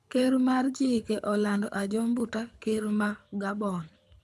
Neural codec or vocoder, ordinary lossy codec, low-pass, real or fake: codec, 24 kHz, 6 kbps, HILCodec; none; none; fake